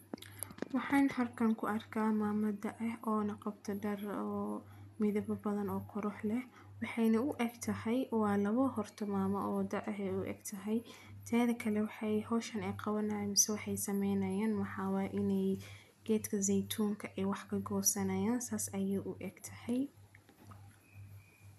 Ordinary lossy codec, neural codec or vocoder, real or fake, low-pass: none; none; real; 14.4 kHz